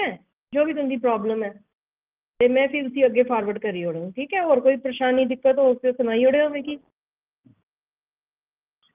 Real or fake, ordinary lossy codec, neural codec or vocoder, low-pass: real; Opus, 32 kbps; none; 3.6 kHz